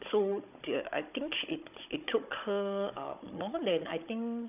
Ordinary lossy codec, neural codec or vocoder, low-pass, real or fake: none; codec, 16 kHz, 16 kbps, FunCodec, trained on Chinese and English, 50 frames a second; 3.6 kHz; fake